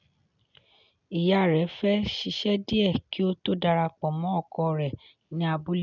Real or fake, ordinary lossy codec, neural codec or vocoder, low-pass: real; none; none; 7.2 kHz